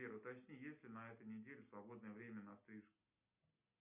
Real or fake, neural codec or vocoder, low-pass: real; none; 3.6 kHz